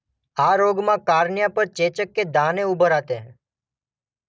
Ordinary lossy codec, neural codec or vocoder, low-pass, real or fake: none; none; none; real